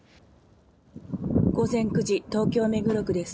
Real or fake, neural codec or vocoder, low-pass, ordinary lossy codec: real; none; none; none